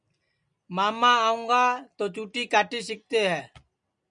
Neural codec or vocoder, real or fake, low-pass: none; real; 9.9 kHz